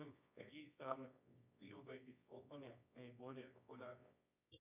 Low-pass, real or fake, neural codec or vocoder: 3.6 kHz; fake; codec, 24 kHz, 0.9 kbps, WavTokenizer, medium music audio release